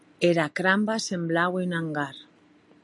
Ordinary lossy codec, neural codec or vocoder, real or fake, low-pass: MP3, 96 kbps; none; real; 10.8 kHz